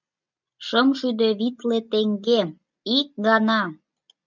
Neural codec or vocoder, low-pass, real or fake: none; 7.2 kHz; real